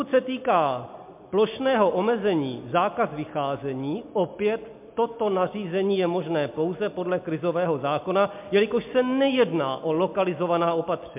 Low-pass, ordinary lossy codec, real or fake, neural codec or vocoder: 3.6 kHz; MP3, 32 kbps; real; none